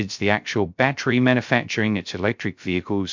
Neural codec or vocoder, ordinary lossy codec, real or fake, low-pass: codec, 16 kHz, 0.3 kbps, FocalCodec; MP3, 64 kbps; fake; 7.2 kHz